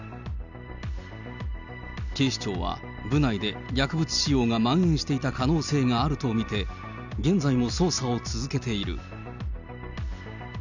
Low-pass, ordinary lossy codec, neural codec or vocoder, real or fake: 7.2 kHz; none; none; real